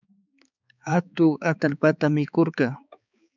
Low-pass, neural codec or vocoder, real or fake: 7.2 kHz; codec, 16 kHz, 4 kbps, X-Codec, HuBERT features, trained on balanced general audio; fake